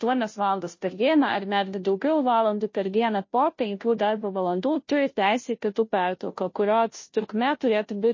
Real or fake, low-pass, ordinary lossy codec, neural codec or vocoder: fake; 7.2 kHz; MP3, 32 kbps; codec, 16 kHz, 0.5 kbps, FunCodec, trained on Chinese and English, 25 frames a second